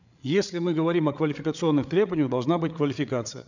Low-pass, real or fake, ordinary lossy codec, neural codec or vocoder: 7.2 kHz; fake; none; codec, 16 kHz, 8 kbps, FreqCodec, larger model